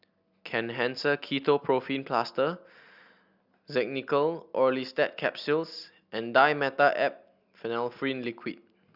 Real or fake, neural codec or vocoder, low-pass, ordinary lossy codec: real; none; 5.4 kHz; Opus, 64 kbps